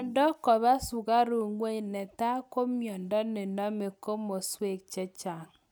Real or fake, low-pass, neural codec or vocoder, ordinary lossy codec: real; none; none; none